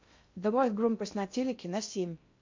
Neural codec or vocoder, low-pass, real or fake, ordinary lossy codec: codec, 16 kHz in and 24 kHz out, 0.6 kbps, FocalCodec, streaming, 2048 codes; 7.2 kHz; fake; MP3, 48 kbps